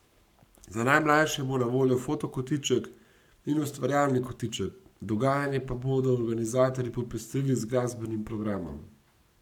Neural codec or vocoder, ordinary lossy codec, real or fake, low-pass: codec, 44.1 kHz, 7.8 kbps, Pupu-Codec; none; fake; 19.8 kHz